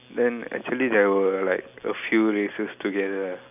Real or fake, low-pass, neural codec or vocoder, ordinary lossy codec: real; 3.6 kHz; none; none